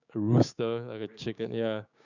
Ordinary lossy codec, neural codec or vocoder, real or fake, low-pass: none; codec, 16 kHz, 6 kbps, DAC; fake; 7.2 kHz